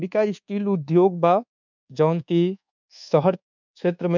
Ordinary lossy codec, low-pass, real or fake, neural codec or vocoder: none; 7.2 kHz; fake; codec, 24 kHz, 1.2 kbps, DualCodec